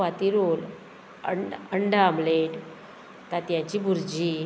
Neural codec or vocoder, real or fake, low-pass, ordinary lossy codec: none; real; none; none